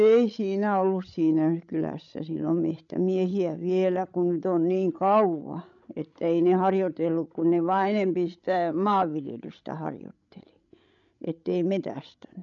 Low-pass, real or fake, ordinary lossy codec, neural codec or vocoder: 7.2 kHz; fake; none; codec, 16 kHz, 16 kbps, FreqCodec, larger model